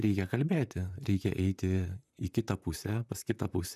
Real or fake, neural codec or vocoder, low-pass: fake; vocoder, 44.1 kHz, 128 mel bands, Pupu-Vocoder; 14.4 kHz